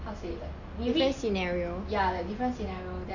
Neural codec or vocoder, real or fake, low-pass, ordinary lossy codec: none; real; 7.2 kHz; none